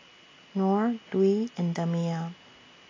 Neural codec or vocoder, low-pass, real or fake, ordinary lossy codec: none; 7.2 kHz; real; AAC, 32 kbps